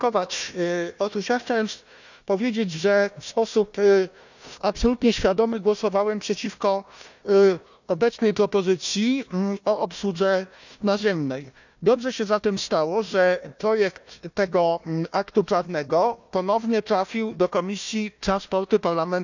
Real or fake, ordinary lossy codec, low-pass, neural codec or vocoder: fake; none; 7.2 kHz; codec, 16 kHz, 1 kbps, FunCodec, trained on Chinese and English, 50 frames a second